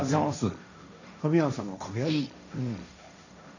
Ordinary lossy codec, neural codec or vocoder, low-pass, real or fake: none; codec, 16 kHz, 1.1 kbps, Voila-Tokenizer; 7.2 kHz; fake